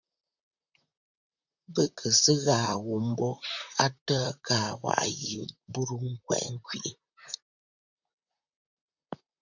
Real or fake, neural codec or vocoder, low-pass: fake; vocoder, 44.1 kHz, 128 mel bands, Pupu-Vocoder; 7.2 kHz